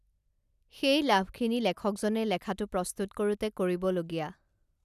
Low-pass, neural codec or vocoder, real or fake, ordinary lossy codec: 14.4 kHz; none; real; none